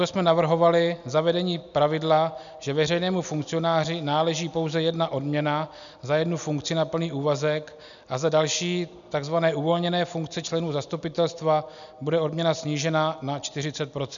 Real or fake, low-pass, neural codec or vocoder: real; 7.2 kHz; none